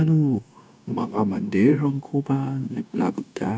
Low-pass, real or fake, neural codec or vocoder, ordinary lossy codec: none; fake; codec, 16 kHz, 0.9 kbps, LongCat-Audio-Codec; none